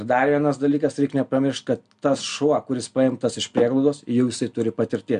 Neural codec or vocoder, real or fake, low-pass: none; real; 9.9 kHz